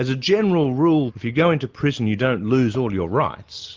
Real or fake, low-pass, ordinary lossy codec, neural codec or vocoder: real; 7.2 kHz; Opus, 32 kbps; none